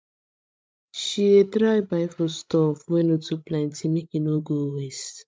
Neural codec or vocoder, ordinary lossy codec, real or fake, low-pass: codec, 16 kHz, 16 kbps, FreqCodec, larger model; none; fake; none